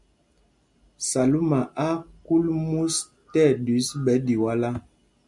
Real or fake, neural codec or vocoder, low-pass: real; none; 10.8 kHz